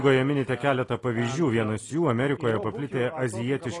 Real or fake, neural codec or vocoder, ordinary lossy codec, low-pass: real; none; AAC, 32 kbps; 10.8 kHz